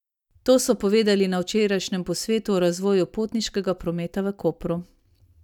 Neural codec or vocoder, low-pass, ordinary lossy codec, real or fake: none; 19.8 kHz; none; real